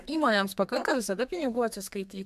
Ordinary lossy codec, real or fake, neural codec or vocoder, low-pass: MP3, 96 kbps; fake; codec, 32 kHz, 1.9 kbps, SNAC; 14.4 kHz